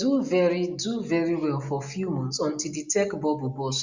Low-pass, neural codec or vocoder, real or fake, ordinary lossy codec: 7.2 kHz; none; real; Opus, 64 kbps